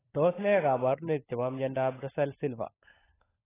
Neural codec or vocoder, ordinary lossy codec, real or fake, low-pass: codec, 16 kHz, 16 kbps, FunCodec, trained on LibriTTS, 50 frames a second; AAC, 16 kbps; fake; 3.6 kHz